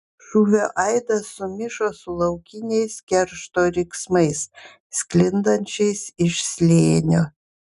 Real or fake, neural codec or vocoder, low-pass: real; none; 10.8 kHz